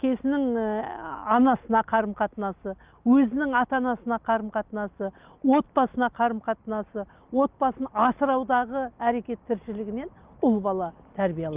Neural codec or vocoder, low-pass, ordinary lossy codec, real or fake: none; 3.6 kHz; Opus, 32 kbps; real